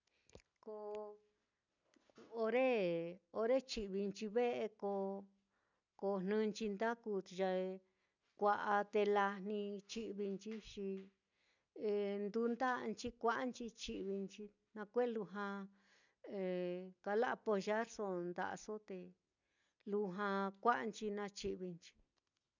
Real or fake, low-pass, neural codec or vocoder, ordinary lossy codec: real; 7.2 kHz; none; none